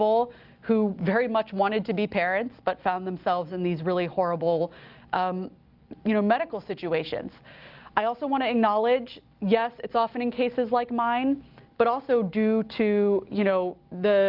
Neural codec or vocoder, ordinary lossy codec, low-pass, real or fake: none; Opus, 32 kbps; 5.4 kHz; real